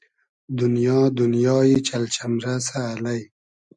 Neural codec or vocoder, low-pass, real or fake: none; 10.8 kHz; real